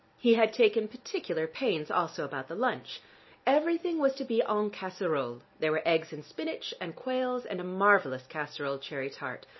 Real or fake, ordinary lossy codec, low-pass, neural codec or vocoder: real; MP3, 24 kbps; 7.2 kHz; none